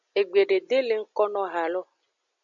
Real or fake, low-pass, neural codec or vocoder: real; 7.2 kHz; none